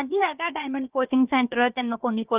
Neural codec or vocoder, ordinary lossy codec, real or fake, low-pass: codec, 16 kHz, about 1 kbps, DyCAST, with the encoder's durations; Opus, 24 kbps; fake; 3.6 kHz